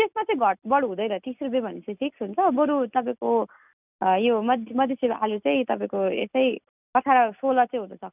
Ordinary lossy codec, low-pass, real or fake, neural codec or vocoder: none; 3.6 kHz; real; none